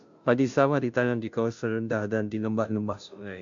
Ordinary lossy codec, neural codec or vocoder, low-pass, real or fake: MP3, 64 kbps; codec, 16 kHz, 0.5 kbps, FunCodec, trained on Chinese and English, 25 frames a second; 7.2 kHz; fake